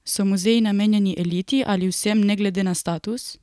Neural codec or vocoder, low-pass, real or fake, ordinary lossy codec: none; none; real; none